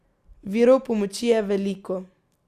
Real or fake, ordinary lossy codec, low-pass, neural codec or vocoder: real; Opus, 64 kbps; 14.4 kHz; none